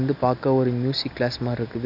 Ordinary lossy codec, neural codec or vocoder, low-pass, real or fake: none; none; 5.4 kHz; real